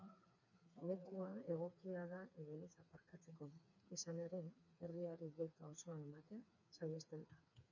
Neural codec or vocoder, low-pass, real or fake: codec, 16 kHz, 4 kbps, FreqCodec, smaller model; 7.2 kHz; fake